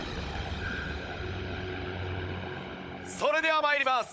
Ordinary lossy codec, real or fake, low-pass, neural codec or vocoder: none; fake; none; codec, 16 kHz, 16 kbps, FunCodec, trained on Chinese and English, 50 frames a second